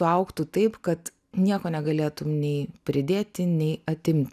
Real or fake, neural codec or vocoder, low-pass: real; none; 14.4 kHz